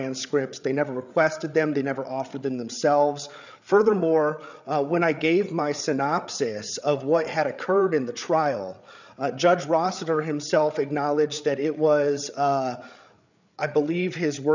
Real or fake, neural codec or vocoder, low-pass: fake; codec, 16 kHz, 8 kbps, FreqCodec, larger model; 7.2 kHz